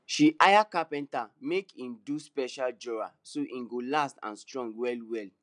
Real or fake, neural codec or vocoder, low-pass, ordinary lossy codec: real; none; 9.9 kHz; none